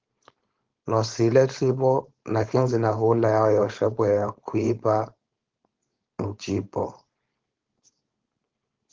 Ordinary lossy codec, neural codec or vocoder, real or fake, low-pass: Opus, 16 kbps; codec, 16 kHz, 4.8 kbps, FACodec; fake; 7.2 kHz